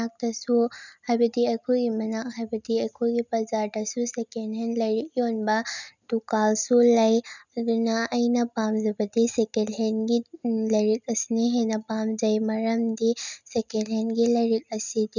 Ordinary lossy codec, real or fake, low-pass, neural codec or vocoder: none; real; 7.2 kHz; none